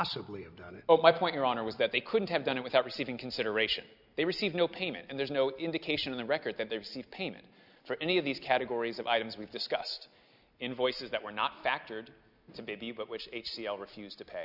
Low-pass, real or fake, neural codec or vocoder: 5.4 kHz; real; none